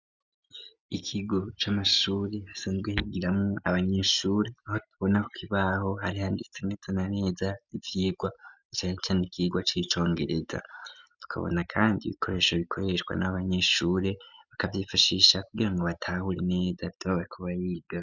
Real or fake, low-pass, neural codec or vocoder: real; 7.2 kHz; none